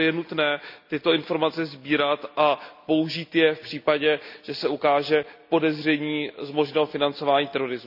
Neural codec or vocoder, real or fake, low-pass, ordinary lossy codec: none; real; 5.4 kHz; none